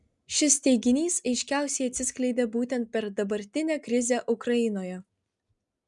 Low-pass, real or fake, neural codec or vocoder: 10.8 kHz; real; none